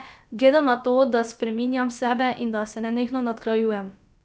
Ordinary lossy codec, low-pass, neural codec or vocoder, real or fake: none; none; codec, 16 kHz, about 1 kbps, DyCAST, with the encoder's durations; fake